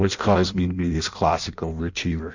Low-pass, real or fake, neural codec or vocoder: 7.2 kHz; fake; codec, 16 kHz in and 24 kHz out, 0.6 kbps, FireRedTTS-2 codec